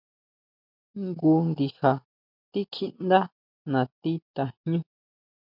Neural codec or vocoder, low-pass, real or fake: none; 5.4 kHz; real